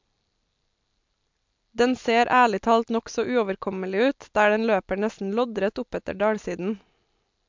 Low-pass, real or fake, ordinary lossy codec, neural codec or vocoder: 7.2 kHz; real; MP3, 64 kbps; none